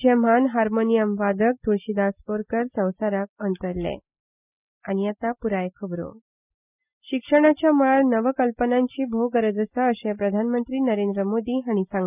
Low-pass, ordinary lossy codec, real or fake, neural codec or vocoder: 3.6 kHz; none; real; none